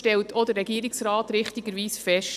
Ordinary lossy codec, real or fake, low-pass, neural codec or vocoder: none; real; 14.4 kHz; none